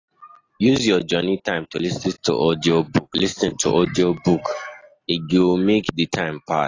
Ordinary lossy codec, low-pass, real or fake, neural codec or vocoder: AAC, 32 kbps; 7.2 kHz; real; none